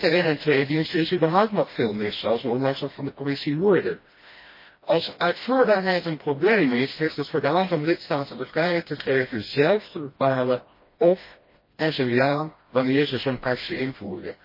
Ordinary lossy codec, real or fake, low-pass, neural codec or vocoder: MP3, 24 kbps; fake; 5.4 kHz; codec, 16 kHz, 1 kbps, FreqCodec, smaller model